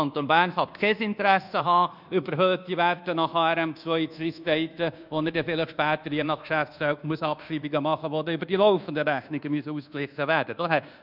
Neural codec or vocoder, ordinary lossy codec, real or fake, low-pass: codec, 24 kHz, 1.2 kbps, DualCodec; none; fake; 5.4 kHz